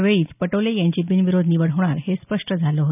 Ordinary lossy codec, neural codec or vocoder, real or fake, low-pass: none; none; real; 3.6 kHz